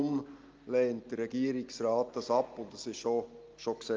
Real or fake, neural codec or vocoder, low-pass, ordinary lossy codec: real; none; 7.2 kHz; Opus, 24 kbps